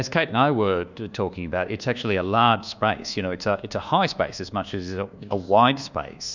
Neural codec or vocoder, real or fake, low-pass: codec, 24 kHz, 1.2 kbps, DualCodec; fake; 7.2 kHz